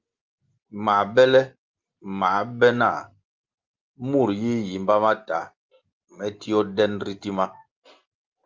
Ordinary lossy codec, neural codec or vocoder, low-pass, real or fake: Opus, 32 kbps; none; 7.2 kHz; real